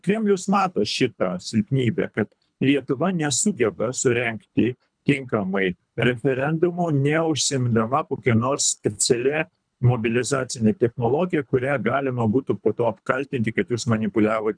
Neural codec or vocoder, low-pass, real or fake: codec, 24 kHz, 3 kbps, HILCodec; 9.9 kHz; fake